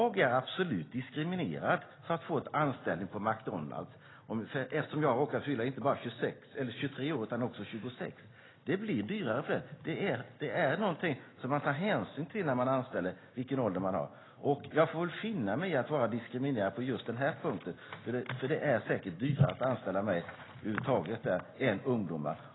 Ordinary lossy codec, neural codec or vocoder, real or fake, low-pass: AAC, 16 kbps; none; real; 7.2 kHz